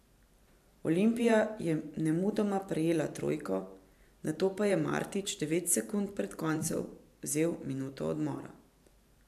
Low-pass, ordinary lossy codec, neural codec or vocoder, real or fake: 14.4 kHz; AAC, 96 kbps; vocoder, 44.1 kHz, 128 mel bands every 512 samples, BigVGAN v2; fake